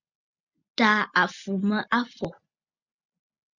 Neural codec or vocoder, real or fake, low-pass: none; real; 7.2 kHz